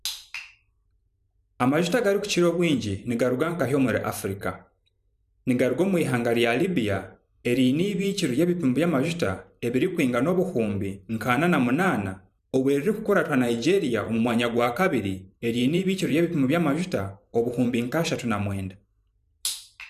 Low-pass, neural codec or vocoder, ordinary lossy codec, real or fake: 14.4 kHz; vocoder, 44.1 kHz, 128 mel bands every 512 samples, BigVGAN v2; none; fake